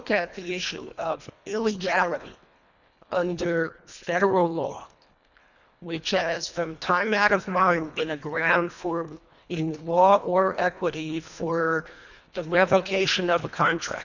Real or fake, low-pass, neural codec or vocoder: fake; 7.2 kHz; codec, 24 kHz, 1.5 kbps, HILCodec